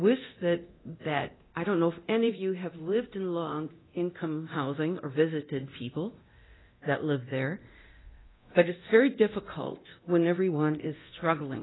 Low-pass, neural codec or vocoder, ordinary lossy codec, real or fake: 7.2 kHz; codec, 24 kHz, 0.9 kbps, DualCodec; AAC, 16 kbps; fake